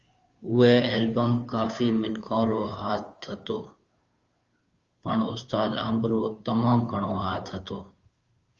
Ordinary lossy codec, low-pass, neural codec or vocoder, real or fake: Opus, 32 kbps; 7.2 kHz; codec, 16 kHz, 2 kbps, FunCodec, trained on Chinese and English, 25 frames a second; fake